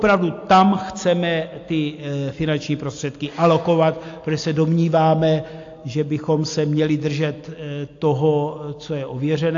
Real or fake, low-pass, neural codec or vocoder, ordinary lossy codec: real; 7.2 kHz; none; AAC, 48 kbps